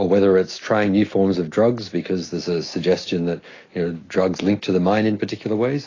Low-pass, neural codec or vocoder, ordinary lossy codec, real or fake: 7.2 kHz; none; AAC, 32 kbps; real